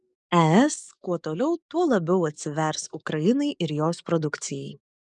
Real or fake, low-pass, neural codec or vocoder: fake; 10.8 kHz; codec, 44.1 kHz, 7.8 kbps, DAC